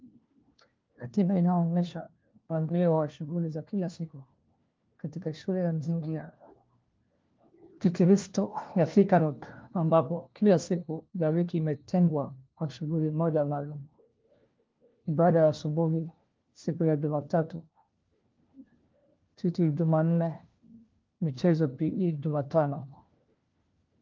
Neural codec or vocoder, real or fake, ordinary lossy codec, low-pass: codec, 16 kHz, 1 kbps, FunCodec, trained on LibriTTS, 50 frames a second; fake; Opus, 32 kbps; 7.2 kHz